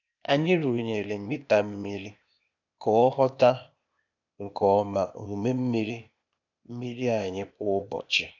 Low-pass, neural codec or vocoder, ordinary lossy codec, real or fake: 7.2 kHz; codec, 16 kHz, 0.8 kbps, ZipCodec; none; fake